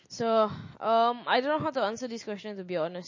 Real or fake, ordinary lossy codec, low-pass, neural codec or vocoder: real; MP3, 32 kbps; 7.2 kHz; none